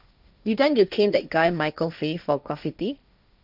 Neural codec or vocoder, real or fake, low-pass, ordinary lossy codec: codec, 16 kHz, 1.1 kbps, Voila-Tokenizer; fake; 5.4 kHz; none